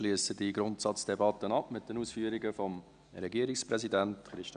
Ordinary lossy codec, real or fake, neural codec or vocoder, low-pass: none; real; none; 9.9 kHz